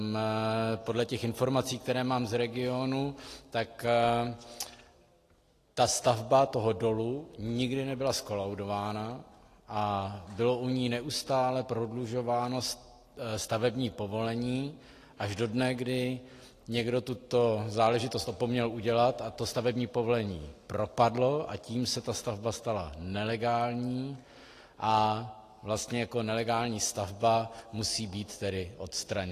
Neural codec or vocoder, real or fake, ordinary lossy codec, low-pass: none; real; AAC, 48 kbps; 14.4 kHz